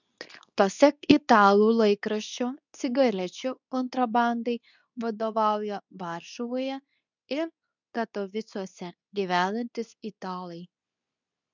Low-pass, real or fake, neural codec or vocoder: 7.2 kHz; fake; codec, 24 kHz, 0.9 kbps, WavTokenizer, medium speech release version 2